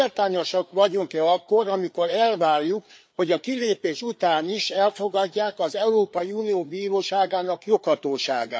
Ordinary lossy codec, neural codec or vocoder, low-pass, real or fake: none; codec, 16 kHz, 4 kbps, FreqCodec, larger model; none; fake